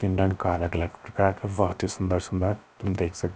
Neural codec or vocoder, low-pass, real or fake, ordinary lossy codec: codec, 16 kHz, about 1 kbps, DyCAST, with the encoder's durations; none; fake; none